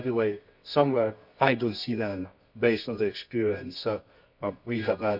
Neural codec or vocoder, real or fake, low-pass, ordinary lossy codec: codec, 24 kHz, 0.9 kbps, WavTokenizer, medium music audio release; fake; 5.4 kHz; none